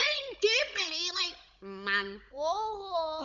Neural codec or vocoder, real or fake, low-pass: codec, 16 kHz, 16 kbps, FunCodec, trained on Chinese and English, 50 frames a second; fake; 7.2 kHz